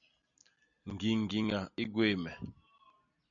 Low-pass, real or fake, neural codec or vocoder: 7.2 kHz; real; none